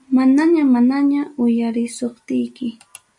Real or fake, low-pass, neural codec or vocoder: real; 10.8 kHz; none